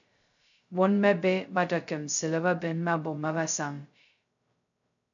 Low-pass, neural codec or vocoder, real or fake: 7.2 kHz; codec, 16 kHz, 0.2 kbps, FocalCodec; fake